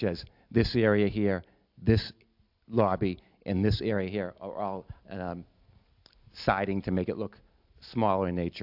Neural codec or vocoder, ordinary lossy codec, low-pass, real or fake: none; AAC, 48 kbps; 5.4 kHz; real